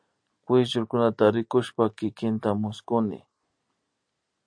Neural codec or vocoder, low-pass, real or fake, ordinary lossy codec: none; 9.9 kHz; real; AAC, 64 kbps